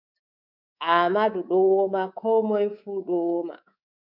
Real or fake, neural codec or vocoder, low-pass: fake; codec, 24 kHz, 3.1 kbps, DualCodec; 5.4 kHz